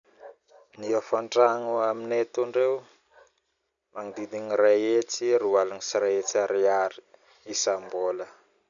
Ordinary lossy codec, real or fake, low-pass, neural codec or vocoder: none; real; 7.2 kHz; none